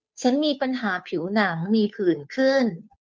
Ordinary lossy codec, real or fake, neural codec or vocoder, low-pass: none; fake; codec, 16 kHz, 2 kbps, FunCodec, trained on Chinese and English, 25 frames a second; none